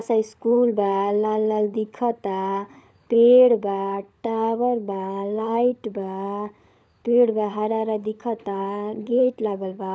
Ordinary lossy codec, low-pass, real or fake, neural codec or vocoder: none; none; fake; codec, 16 kHz, 16 kbps, FunCodec, trained on LibriTTS, 50 frames a second